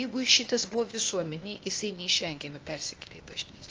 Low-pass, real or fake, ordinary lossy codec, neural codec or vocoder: 7.2 kHz; fake; Opus, 24 kbps; codec, 16 kHz, 0.8 kbps, ZipCodec